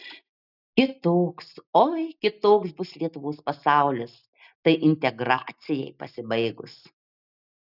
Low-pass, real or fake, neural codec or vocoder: 5.4 kHz; real; none